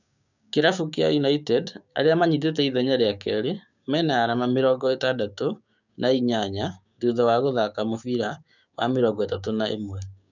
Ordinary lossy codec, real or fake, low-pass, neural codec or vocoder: none; fake; 7.2 kHz; codec, 16 kHz, 6 kbps, DAC